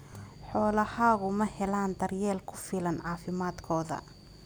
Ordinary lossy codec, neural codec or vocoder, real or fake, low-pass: none; none; real; none